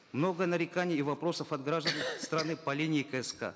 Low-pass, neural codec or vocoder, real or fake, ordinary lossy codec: none; none; real; none